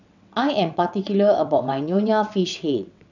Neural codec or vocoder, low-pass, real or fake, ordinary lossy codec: vocoder, 22.05 kHz, 80 mel bands, WaveNeXt; 7.2 kHz; fake; none